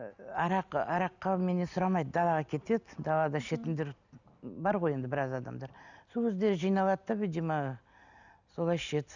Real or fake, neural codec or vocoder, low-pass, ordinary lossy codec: real; none; 7.2 kHz; none